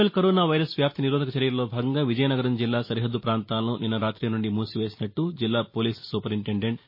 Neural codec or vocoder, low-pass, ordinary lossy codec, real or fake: none; 5.4 kHz; MP3, 24 kbps; real